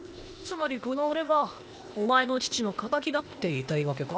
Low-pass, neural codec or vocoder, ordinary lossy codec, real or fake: none; codec, 16 kHz, 0.8 kbps, ZipCodec; none; fake